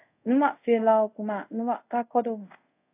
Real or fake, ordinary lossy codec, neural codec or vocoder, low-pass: fake; AAC, 24 kbps; codec, 24 kHz, 0.5 kbps, DualCodec; 3.6 kHz